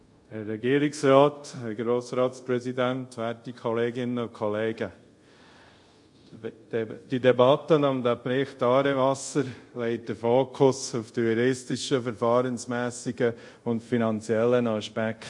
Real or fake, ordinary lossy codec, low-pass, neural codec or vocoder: fake; MP3, 48 kbps; 10.8 kHz; codec, 24 kHz, 0.5 kbps, DualCodec